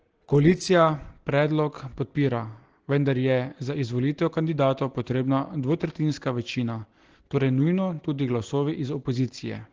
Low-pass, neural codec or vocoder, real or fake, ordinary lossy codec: 7.2 kHz; none; real; Opus, 16 kbps